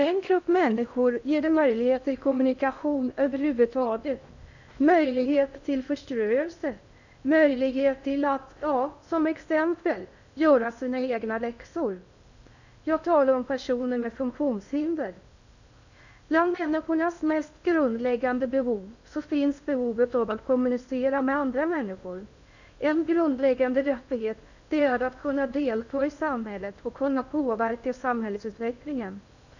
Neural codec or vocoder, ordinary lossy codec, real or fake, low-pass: codec, 16 kHz in and 24 kHz out, 0.6 kbps, FocalCodec, streaming, 2048 codes; none; fake; 7.2 kHz